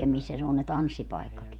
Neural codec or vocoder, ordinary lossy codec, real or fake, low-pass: vocoder, 48 kHz, 128 mel bands, Vocos; none; fake; 19.8 kHz